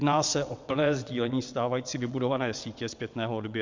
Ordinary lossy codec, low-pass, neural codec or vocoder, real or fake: MP3, 64 kbps; 7.2 kHz; vocoder, 22.05 kHz, 80 mel bands, WaveNeXt; fake